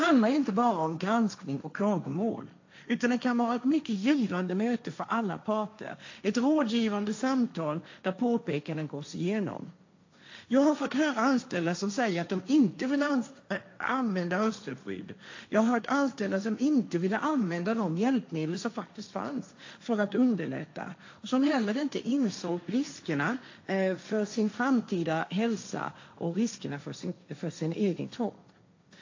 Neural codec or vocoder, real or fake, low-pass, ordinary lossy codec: codec, 16 kHz, 1.1 kbps, Voila-Tokenizer; fake; 7.2 kHz; AAC, 48 kbps